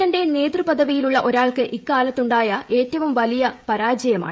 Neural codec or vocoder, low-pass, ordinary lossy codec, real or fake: codec, 16 kHz, 16 kbps, FreqCodec, smaller model; none; none; fake